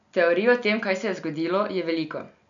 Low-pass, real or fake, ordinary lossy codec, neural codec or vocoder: 7.2 kHz; real; none; none